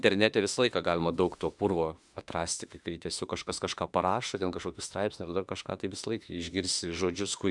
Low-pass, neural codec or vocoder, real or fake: 10.8 kHz; autoencoder, 48 kHz, 32 numbers a frame, DAC-VAE, trained on Japanese speech; fake